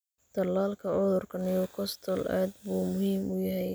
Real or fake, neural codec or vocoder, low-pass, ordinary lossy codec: real; none; none; none